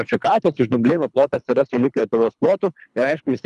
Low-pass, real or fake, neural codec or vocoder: 10.8 kHz; fake; codec, 24 kHz, 3 kbps, HILCodec